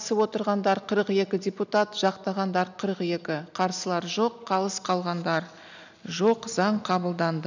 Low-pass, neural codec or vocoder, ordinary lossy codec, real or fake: 7.2 kHz; none; none; real